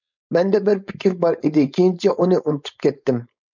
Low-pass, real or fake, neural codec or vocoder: 7.2 kHz; fake; codec, 16 kHz, 4.8 kbps, FACodec